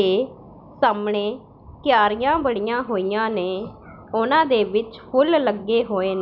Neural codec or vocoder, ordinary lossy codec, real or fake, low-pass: none; none; real; 5.4 kHz